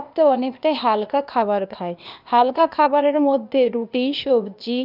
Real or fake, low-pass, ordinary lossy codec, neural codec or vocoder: fake; 5.4 kHz; none; codec, 16 kHz, 0.8 kbps, ZipCodec